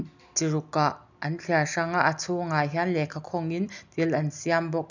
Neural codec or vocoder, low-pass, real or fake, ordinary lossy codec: none; 7.2 kHz; real; none